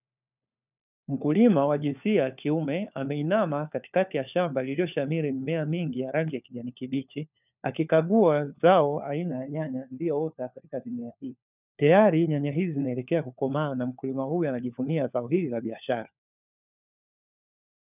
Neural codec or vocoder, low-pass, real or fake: codec, 16 kHz, 4 kbps, FunCodec, trained on LibriTTS, 50 frames a second; 3.6 kHz; fake